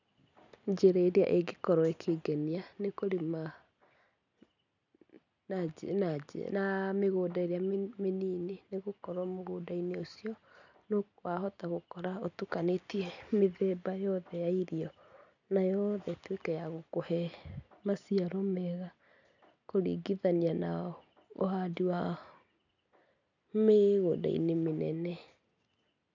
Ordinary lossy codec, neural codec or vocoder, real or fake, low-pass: none; none; real; 7.2 kHz